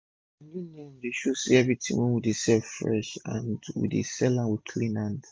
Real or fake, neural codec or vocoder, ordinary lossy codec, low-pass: real; none; none; none